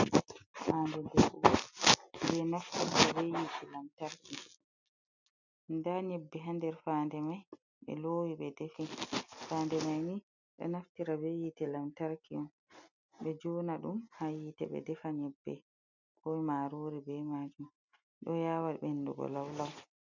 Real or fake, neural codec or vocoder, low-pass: real; none; 7.2 kHz